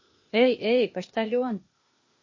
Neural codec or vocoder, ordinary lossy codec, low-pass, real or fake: codec, 16 kHz, 0.8 kbps, ZipCodec; MP3, 32 kbps; 7.2 kHz; fake